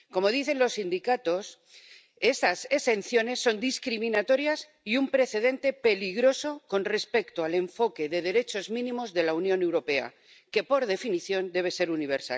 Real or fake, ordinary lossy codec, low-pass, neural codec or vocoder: real; none; none; none